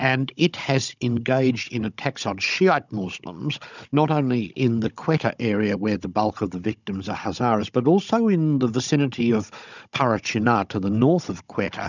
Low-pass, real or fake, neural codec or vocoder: 7.2 kHz; fake; codec, 16 kHz, 16 kbps, FunCodec, trained on Chinese and English, 50 frames a second